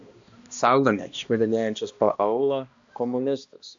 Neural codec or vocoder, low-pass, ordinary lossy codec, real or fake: codec, 16 kHz, 1 kbps, X-Codec, HuBERT features, trained on balanced general audio; 7.2 kHz; AAC, 64 kbps; fake